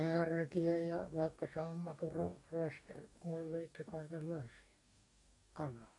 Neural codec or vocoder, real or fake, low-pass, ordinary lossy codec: codec, 44.1 kHz, 2.6 kbps, DAC; fake; 10.8 kHz; AAC, 64 kbps